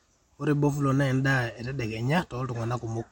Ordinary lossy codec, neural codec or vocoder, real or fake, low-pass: MP3, 64 kbps; none; real; 19.8 kHz